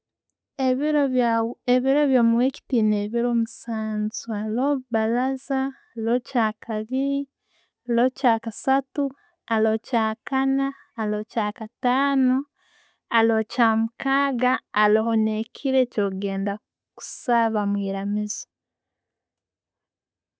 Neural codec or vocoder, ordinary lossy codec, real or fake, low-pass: none; none; real; none